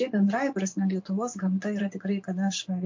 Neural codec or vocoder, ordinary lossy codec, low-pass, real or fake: vocoder, 24 kHz, 100 mel bands, Vocos; MP3, 48 kbps; 7.2 kHz; fake